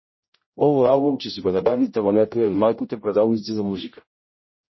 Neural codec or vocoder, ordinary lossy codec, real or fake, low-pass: codec, 16 kHz, 0.5 kbps, X-Codec, HuBERT features, trained on general audio; MP3, 24 kbps; fake; 7.2 kHz